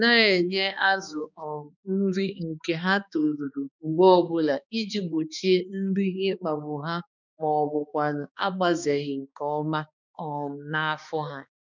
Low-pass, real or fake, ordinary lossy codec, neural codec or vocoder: 7.2 kHz; fake; none; codec, 16 kHz, 2 kbps, X-Codec, HuBERT features, trained on balanced general audio